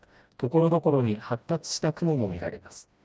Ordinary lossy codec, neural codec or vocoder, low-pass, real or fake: none; codec, 16 kHz, 1 kbps, FreqCodec, smaller model; none; fake